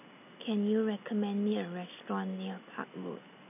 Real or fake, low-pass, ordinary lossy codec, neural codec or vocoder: real; 3.6 kHz; none; none